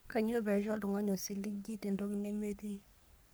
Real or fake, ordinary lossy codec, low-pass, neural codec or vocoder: fake; none; none; codec, 44.1 kHz, 3.4 kbps, Pupu-Codec